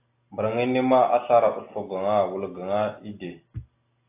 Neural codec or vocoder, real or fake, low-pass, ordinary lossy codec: none; real; 3.6 kHz; AAC, 24 kbps